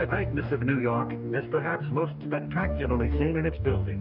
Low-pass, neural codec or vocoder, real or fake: 5.4 kHz; codec, 44.1 kHz, 2.6 kbps, DAC; fake